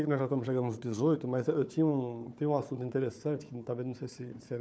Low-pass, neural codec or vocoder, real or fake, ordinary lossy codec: none; codec, 16 kHz, 4 kbps, FunCodec, trained on Chinese and English, 50 frames a second; fake; none